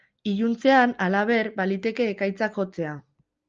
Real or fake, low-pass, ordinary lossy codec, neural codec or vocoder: real; 7.2 kHz; Opus, 24 kbps; none